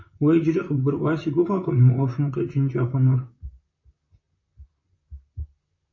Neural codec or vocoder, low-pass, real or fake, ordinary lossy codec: vocoder, 44.1 kHz, 128 mel bands, Pupu-Vocoder; 7.2 kHz; fake; MP3, 32 kbps